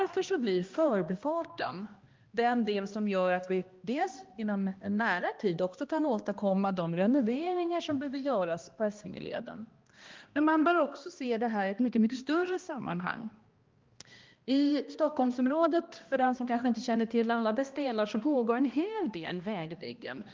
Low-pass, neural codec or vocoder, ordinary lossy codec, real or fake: 7.2 kHz; codec, 16 kHz, 1 kbps, X-Codec, HuBERT features, trained on balanced general audio; Opus, 32 kbps; fake